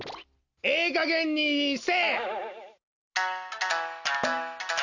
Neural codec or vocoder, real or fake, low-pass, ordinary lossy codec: none; real; 7.2 kHz; none